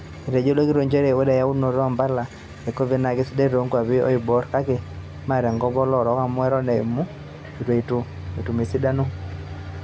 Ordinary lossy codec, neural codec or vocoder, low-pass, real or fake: none; none; none; real